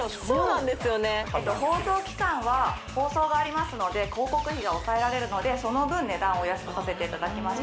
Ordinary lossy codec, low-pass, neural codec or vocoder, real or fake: none; none; none; real